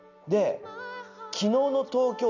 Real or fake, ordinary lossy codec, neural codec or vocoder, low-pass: real; none; none; 7.2 kHz